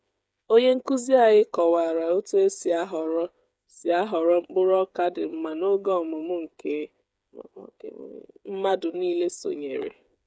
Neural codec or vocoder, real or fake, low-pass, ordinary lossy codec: codec, 16 kHz, 8 kbps, FreqCodec, smaller model; fake; none; none